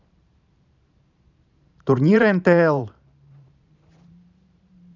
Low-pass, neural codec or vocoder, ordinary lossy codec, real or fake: 7.2 kHz; vocoder, 44.1 kHz, 128 mel bands every 512 samples, BigVGAN v2; none; fake